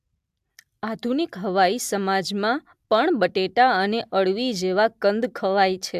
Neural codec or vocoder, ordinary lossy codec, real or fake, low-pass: none; AAC, 96 kbps; real; 14.4 kHz